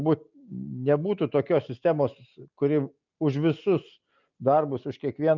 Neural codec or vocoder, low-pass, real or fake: none; 7.2 kHz; real